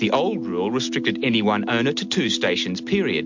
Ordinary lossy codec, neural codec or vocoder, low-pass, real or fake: MP3, 48 kbps; none; 7.2 kHz; real